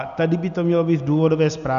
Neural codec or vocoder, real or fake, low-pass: none; real; 7.2 kHz